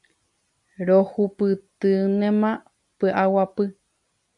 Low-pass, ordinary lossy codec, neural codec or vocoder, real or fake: 10.8 kHz; MP3, 96 kbps; none; real